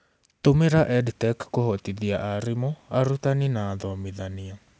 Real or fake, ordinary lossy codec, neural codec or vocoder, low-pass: real; none; none; none